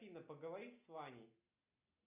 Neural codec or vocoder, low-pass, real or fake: none; 3.6 kHz; real